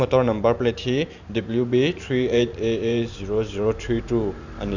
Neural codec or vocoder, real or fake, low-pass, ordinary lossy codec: vocoder, 44.1 kHz, 128 mel bands every 512 samples, BigVGAN v2; fake; 7.2 kHz; none